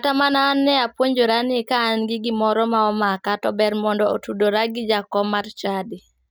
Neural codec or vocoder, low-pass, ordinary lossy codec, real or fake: none; none; none; real